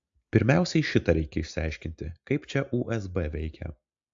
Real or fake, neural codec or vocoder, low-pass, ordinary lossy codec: real; none; 7.2 kHz; AAC, 64 kbps